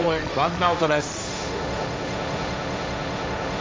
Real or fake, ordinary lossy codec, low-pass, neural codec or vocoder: fake; none; none; codec, 16 kHz, 1.1 kbps, Voila-Tokenizer